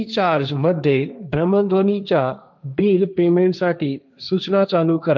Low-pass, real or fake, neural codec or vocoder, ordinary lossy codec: none; fake; codec, 16 kHz, 1.1 kbps, Voila-Tokenizer; none